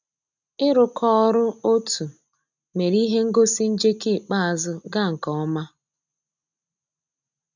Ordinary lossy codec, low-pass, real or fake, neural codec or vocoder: none; 7.2 kHz; real; none